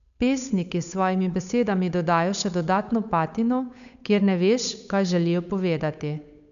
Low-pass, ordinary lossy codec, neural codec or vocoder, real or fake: 7.2 kHz; none; codec, 16 kHz, 8 kbps, FunCodec, trained on Chinese and English, 25 frames a second; fake